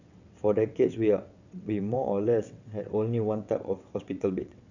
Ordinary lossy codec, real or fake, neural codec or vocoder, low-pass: none; fake; vocoder, 22.05 kHz, 80 mel bands, WaveNeXt; 7.2 kHz